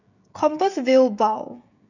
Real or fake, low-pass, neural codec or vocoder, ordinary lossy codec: fake; 7.2 kHz; codec, 16 kHz, 16 kbps, FreqCodec, smaller model; none